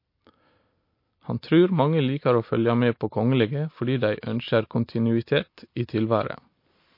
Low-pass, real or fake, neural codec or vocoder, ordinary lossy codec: 5.4 kHz; real; none; MP3, 32 kbps